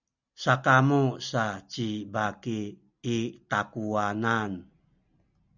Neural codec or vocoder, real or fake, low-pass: none; real; 7.2 kHz